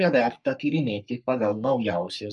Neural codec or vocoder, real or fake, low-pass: codec, 44.1 kHz, 3.4 kbps, Pupu-Codec; fake; 10.8 kHz